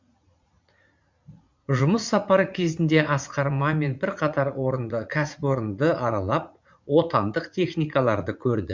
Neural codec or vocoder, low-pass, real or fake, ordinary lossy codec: vocoder, 44.1 kHz, 80 mel bands, Vocos; 7.2 kHz; fake; MP3, 64 kbps